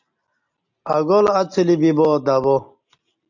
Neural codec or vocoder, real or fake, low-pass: none; real; 7.2 kHz